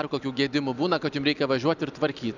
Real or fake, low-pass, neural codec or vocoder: real; 7.2 kHz; none